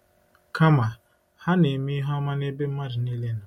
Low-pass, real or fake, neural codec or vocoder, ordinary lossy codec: 19.8 kHz; real; none; MP3, 64 kbps